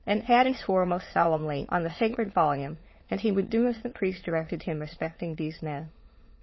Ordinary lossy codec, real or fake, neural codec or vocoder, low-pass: MP3, 24 kbps; fake; autoencoder, 22.05 kHz, a latent of 192 numbers a frame, VITS, trained on many speakers; 7.2 kHz